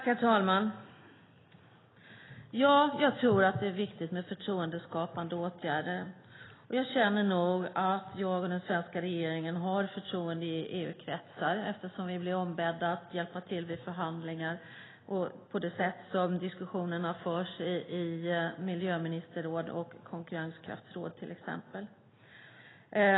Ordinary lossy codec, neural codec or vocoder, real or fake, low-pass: AAC, 16 kbps; none; real; 7.2 kHz